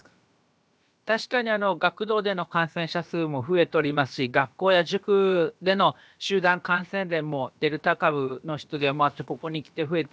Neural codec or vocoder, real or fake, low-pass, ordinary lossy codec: codec, 16 kHz, about 1 kbps, DyCAST, with the encoder's durations; fake; none; none